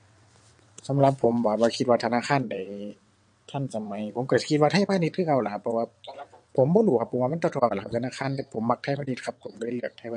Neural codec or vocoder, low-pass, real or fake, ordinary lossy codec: vocoder, 22.05 kHz, 80 mel bands, WaveNeXt; 9.9 kHz; fake; MP3, 48 kbps